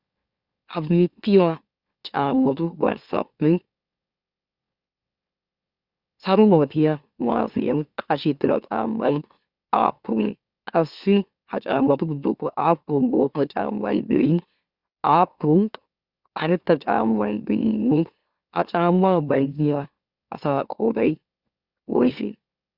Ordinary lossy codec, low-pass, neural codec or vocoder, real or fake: Opus, 64 kbps; 5.4 kHz; autoencoder, 44.1 kHz, a latent of 192 numbers a frame, MeloTTS; fake